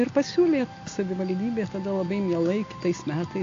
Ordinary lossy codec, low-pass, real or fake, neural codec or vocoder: AAC, 48 kbps; 7.2 kHz; real; none